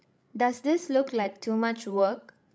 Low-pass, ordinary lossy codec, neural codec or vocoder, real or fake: none; none; codec, 16 kHz, 16 kbps, FreqCodec, larger model; fake